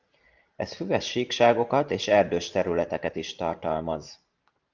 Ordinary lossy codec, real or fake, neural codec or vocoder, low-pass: Opus, 24 kbps; fake; vocoder, 44.1 kHz, 128 mel bands every 512 samples, BigVGAN v2; 7.2 kHz